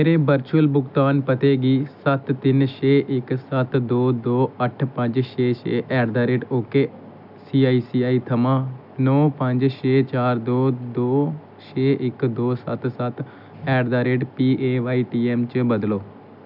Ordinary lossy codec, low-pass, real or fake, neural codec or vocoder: none; 5.4 kHz; real; none